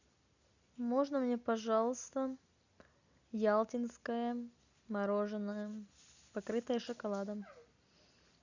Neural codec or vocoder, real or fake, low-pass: none; real; 7.2 kHz